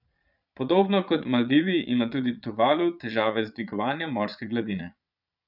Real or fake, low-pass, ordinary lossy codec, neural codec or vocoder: fake; 5.4 kHz; none; vocoder, 22.05 kHz, 80 mel bands, Vocos